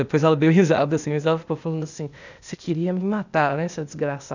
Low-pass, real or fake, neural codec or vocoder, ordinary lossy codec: 7.2 kHz; fake; codec, 16 kHz, 0.8 kbps, ZipCodec; none